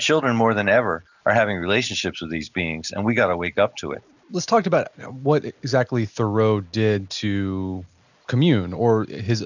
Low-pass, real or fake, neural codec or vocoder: 7.2 kHz; real; none